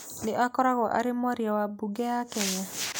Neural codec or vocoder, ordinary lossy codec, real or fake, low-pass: none; none; real; none